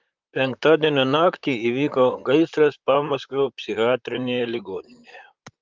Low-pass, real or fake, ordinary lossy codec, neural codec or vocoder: 7.2 kHz; fake; Opus, 24 kbps; vocoder, 44.1 kHz, 128 mel bands, Pupu-Vocoder